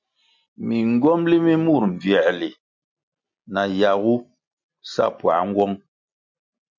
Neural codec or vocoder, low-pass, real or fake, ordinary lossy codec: none; 7.2 kHz; real; MP3, 64 kbps